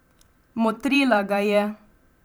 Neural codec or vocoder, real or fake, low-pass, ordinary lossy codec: vocoder, 44.1 kHz, 128 mel bands every 512 samples, BigVGAN v2; fake; none; none